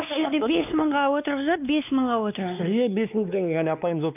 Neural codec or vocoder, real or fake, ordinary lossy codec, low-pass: codec, 16 kHz, 2 kbps, X-Codec, WavLM features, trained on Multilingual LibriSpeech; fake; none; 3.6 kHz